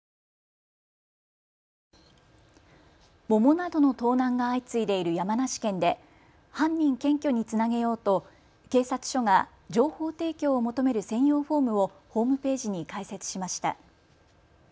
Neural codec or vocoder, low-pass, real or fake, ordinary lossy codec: none; none; real; none